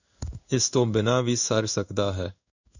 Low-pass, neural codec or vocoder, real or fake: 7.2 kHz; codec, 16 kHz in and 24 kHz out, 1 kbps, XY-Tokenizer; fake